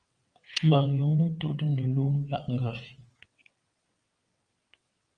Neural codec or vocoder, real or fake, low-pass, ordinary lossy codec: vocoder, 22.05 kHz, 80 mel bands, WaveNeXt; fake; 9.9 kHz; Opus, 32 kbps